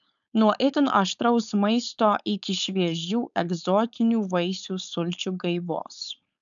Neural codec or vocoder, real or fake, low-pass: codec, 16 kHz, 4.8 kbps, FACodec; fake; 7.2 kHz